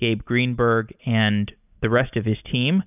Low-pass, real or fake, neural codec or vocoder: 3.6 kHz; real; none